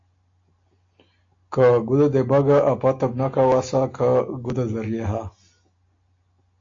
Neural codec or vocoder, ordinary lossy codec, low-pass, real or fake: none; AAC, 48 kbps; 7.2 kHz; real